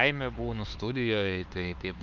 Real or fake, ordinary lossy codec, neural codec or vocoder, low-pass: fake; Opus, 24 kbps; codec, 16 kHz, 4 kbps, X-Codec, HuBERT features, trained on LibriSpeech; 7.2 kHz